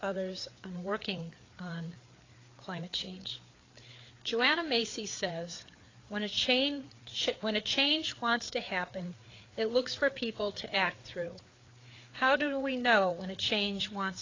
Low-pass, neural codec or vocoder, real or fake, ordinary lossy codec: 7.2 kHz; codec, 16 kHz, 4 kbps, FreqCodec, larger model; fake; AAC, 32 kbps